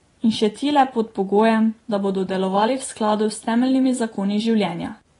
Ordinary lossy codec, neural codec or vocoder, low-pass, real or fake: AAC, 32 kbps; vocoder, 24 kHz, 100 mel bands, Vocos; 10.8 kHz; fake